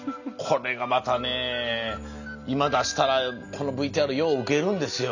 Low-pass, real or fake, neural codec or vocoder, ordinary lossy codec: 7.2 kHz; real; none; none